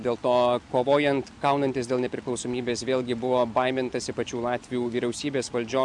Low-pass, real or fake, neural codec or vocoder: 10.8 kHz; real; none